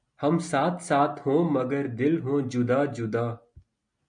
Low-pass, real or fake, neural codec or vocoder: 9.9 kHz; real; none